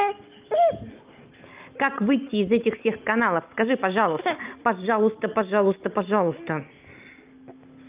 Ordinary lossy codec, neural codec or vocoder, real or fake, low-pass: Opus, 24 kbps; none; real; 3.6 kHz